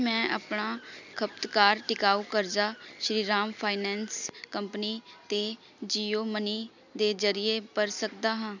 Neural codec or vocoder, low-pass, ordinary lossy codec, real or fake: none; 7.2 kHz; none; real